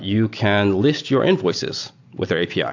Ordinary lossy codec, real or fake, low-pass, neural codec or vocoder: AAC, 48 kbps; real; 7.2 kHz; none